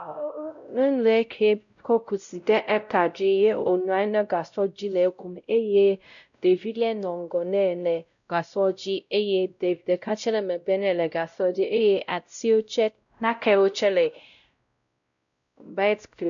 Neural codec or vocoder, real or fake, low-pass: codec, 16 kHz, 0.5 kbps, X-Codec, WavLM features, trained on Multilingual LibriSpeech; fake; 7.2 kHz